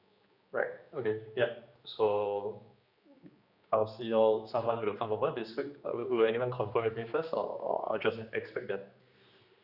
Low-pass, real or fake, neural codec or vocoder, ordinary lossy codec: 5.4 kHz; fake; codec, 16 kHz, 2 kbps, X-Codec, HuBERT features, trained on general audio; Opus, 64 kbps